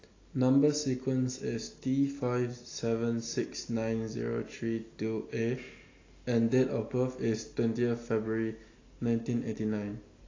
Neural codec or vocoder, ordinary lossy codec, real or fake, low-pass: none; AAC, 32 kbps; real; 7.2 kHz